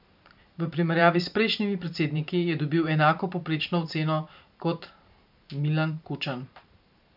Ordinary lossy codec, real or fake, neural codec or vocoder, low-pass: none; fake; vocoder, 44.1 kHz, 128 mel bands every 512 samples, BigVGAN v2; 5.4 kHz